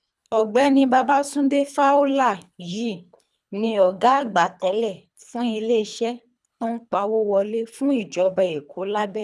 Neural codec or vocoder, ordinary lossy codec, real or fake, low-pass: codec, 24 kHz, 3 kbps, HILCodec; none; fake; none